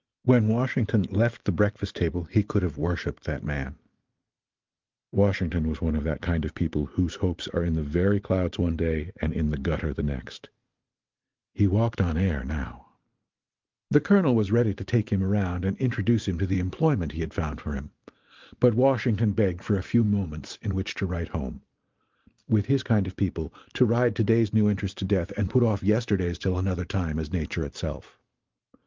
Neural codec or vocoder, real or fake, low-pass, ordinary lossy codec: vocoder, 44.1 kHz, 128 mel bands every 512 samples, BigVGAN v2; fake; 7.2 kHz; Opus, 24 kbps